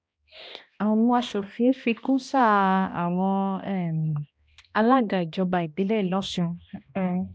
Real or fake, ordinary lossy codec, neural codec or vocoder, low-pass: fake; none; codec, 16 kHz, 1 kbps, X-Codec, HuBERT features, trained on balanced general audio; none